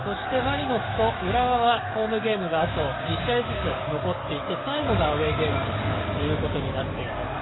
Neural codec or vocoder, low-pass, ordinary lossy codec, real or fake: codec, 44.1 kHz, 7.8 kbps, DAC; 7.2 kHz; AAC, 16 kbps; fake